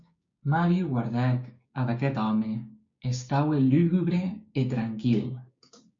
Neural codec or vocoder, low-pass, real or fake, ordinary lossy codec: codec, 16 kHz, 6 kbps, DAC; 7.2 kHz; fake; MP3, 48 kbps